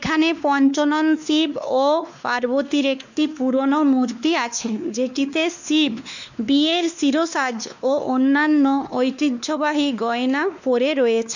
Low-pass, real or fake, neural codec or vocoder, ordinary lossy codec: 7.2 kHz; fake; codec, 16 kHz, 2 kbps, X-Codec, WavLM features, trained on Multilingual LibriSpeech; none